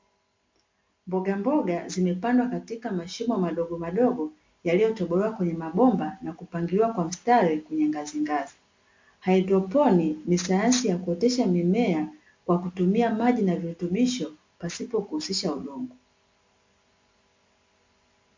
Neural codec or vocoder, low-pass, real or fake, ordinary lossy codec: none; 7.2 kHz; real; MP3, 48 kbps